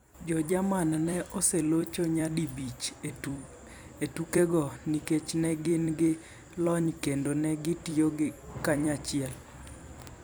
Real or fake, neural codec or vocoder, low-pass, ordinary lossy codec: fake; vocoder, 44.1 kHz, 128 mel bands every 256 samples, BigVGAN v2; none; none